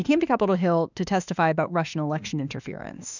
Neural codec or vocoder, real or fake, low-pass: autoencoder, 48 kHz, 32 numbers a frame, DAC-VAE, trained on Japanese speech; fake; 7.2 kHz